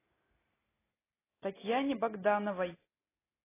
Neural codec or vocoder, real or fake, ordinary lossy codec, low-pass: none; real; AAC, 16 kbps; 3.6 kHz